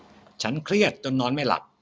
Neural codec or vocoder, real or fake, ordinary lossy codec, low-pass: none; real; none; none